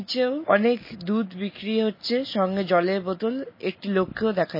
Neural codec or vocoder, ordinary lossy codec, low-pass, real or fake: none; MP3, 24 kbps; 5.4 kHz; real